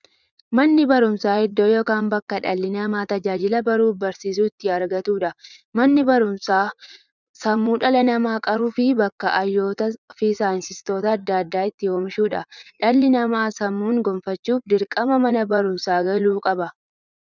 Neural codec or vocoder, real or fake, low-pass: vocoder, 44.1 kHz, 80 mel bands, Vocos; fake; 7.2 kHz